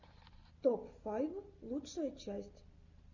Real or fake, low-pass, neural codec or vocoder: real; 7.2 kHz; none